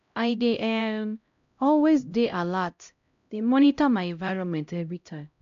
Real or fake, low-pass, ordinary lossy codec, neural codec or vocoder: fake; 7.2 kHz; AAC, 64 kbps; codec, 16 kHz, 0.5 kbps, X-Codec, HuBERT features, trained on LibriSpeech